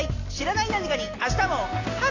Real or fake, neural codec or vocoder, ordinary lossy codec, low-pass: real; none; AAC, 32 kbps; 7.2 kHz